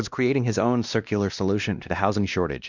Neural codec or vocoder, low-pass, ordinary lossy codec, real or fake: codec, 16 kHz, 1 kbps, X-Codec, WavLM features, trained on Multilingual LibriSpeech; 7.2 kHz; Opus, 64 kbps; fake